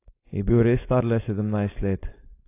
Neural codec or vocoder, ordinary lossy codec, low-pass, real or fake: codec, 16 kHz, 4.8 kbps, FACodec; AAC, 24 kbps; 3.6 kHz; fake